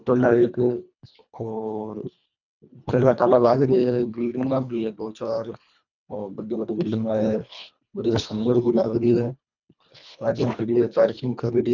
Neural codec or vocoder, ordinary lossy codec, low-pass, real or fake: codec, 24 kHz, 1.5 kbps, HILCodec; none; 7.2 kHz; fake